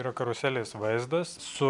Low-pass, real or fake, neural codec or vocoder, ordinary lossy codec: 10.8 kHz; real; none; MP3, 96 kbps